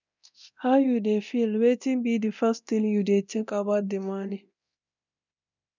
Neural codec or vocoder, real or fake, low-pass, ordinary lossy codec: codec, 24 kHz, 0.9 kbps, DualCodec; fake; 7.2 kHz; none